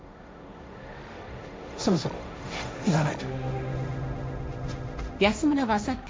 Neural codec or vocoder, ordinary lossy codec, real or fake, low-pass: codec, 16 kHz, 1.1 kbps, Voila-Tokenizer; none; fake; none